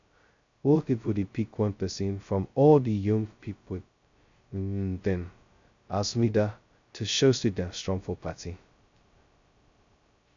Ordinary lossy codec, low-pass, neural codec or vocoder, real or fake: MP3, 64 kbps; 7.2 kHz; codec, 16 kHz, 0.2 kbps, FocalCodec; fake